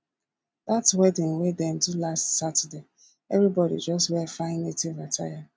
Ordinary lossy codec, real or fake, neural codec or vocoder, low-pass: none; real; none; none